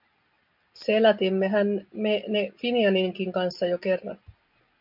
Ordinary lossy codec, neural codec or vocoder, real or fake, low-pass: MP3, 48 kbps; none; real; 5.4 kHz